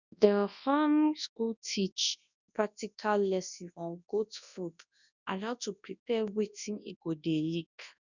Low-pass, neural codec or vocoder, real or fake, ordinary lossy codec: 7.2 kHz; codec, 24 kHz, 0.9 kbps, WavTokenizer, large speech release; fake; none